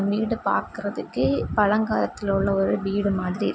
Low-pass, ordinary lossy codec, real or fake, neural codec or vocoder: none; none; real; none